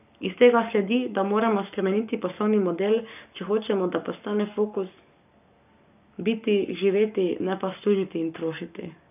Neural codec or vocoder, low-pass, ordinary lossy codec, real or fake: codec, 44.1 kHz, 7.8 kbps, Pupu-Codec; 3.6 kHz; none; fake